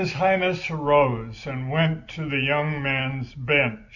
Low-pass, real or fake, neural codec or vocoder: 7.2 kHz; real; none